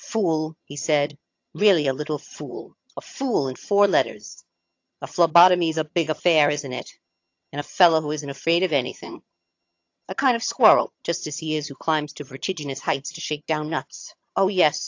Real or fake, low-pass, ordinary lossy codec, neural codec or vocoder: fake; 7.2 kHz; AAC, 48 kbps; vocoder, 22.05 kHz, 80 mel bands, HiFi-GAN